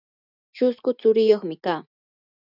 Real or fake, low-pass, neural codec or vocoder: real; 5.4 kHz; none